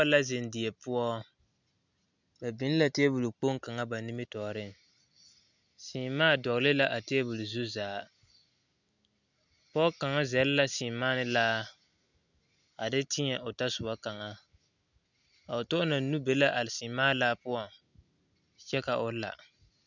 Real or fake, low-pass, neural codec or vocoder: real; 7.2 kHz; none